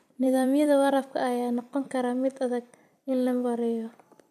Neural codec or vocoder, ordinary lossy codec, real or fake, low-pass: vocoder, 44.1 kHz, 128 mel bands every 256 samples, BigVGAN v2; AAC, 96 kbps; fake; 14.4 kHz